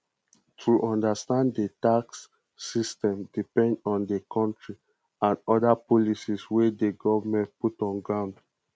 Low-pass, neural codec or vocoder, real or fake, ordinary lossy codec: none; none; real; none